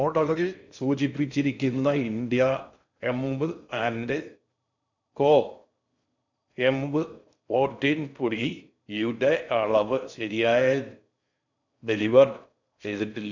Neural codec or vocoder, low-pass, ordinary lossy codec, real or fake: codec, 16 kHz in and 24 kHz out, 0.6 kbps, FocalCodec, streaming, 2048 codes; 7.2 kHz; none; fake